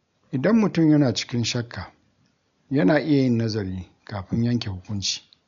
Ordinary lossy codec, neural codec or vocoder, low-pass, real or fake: none; none; 7.2 kHz; real